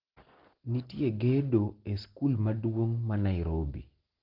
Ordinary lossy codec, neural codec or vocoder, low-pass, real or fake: Opus, 16 kbps; none; 5.4 kHz; real